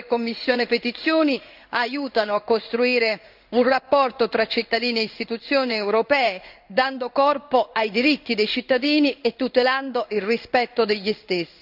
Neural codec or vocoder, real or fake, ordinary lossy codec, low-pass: codec, 16 kHz in and 24 kHz out, 1 kbps, XY-Tokenizer; fake; none; 5.4 kHz